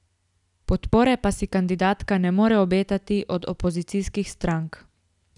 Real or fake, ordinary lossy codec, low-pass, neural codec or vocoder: real; none; 10.8 kHz; none